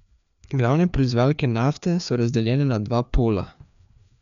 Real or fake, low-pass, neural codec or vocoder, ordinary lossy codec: fake; 7.2 kHz; codec, 16 kHz, 2 kbps, FreqCodec, larger model; none